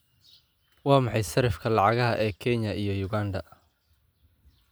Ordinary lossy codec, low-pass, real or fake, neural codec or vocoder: none; none; real; none